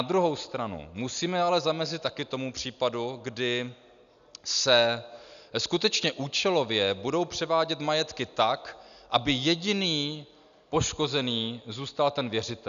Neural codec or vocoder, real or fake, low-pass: none; real; 7.2 kHz